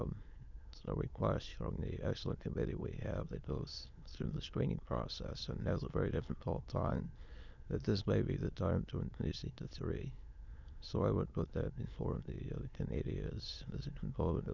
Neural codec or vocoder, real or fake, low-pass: autoencoder, 22.05 kHz, a latent of 192 numbers a frame, VITS, trained on many speakers; fake; 7.2 kHz